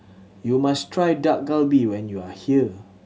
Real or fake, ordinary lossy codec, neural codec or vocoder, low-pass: real; none; none; none